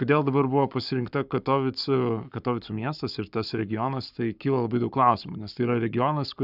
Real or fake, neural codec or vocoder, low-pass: fake; codec, 44.1 kHz, 7.8 kbps, Pupu-Codec; 5.4 kHz